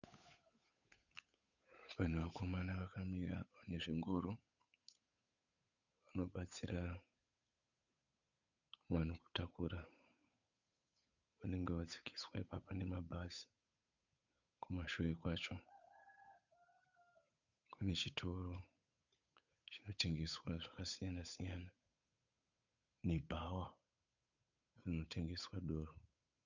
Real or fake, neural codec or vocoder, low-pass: fake; codec, 16 kHz, 8 kbps, FunCodec, trained on Chinese and English, 25 frames a second; 7.2 kHz